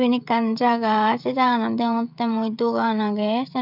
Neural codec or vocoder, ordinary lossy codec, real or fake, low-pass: codec, 16 kHz, 16 kbps, FreqCodec, smaller model; none; fake; 5.4 kHz